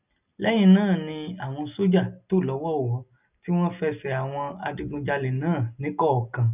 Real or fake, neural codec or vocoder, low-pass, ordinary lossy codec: real; none; 3.6 kHz; none